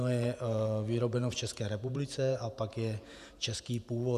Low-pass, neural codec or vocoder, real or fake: 14.4 kHz; vocoder, 48 kHz, 128 mel bands, Vocos; fake